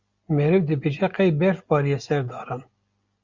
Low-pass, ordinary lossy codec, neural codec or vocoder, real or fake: 7.2 kHz; Opus, 64 kbps; none; real